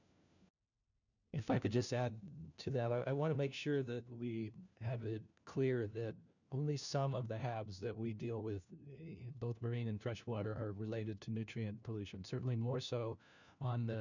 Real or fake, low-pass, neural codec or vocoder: fake; 7.2 kHz; codec, 16 kHz, 1 kbps, FunCodec, trained on LibriTTS, 50 frames a second